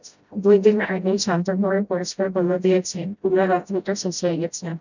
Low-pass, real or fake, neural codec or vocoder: 7.2 kHz; fake; codec, 16 kHz, 0.5 kbps, FreqCodec, smaller model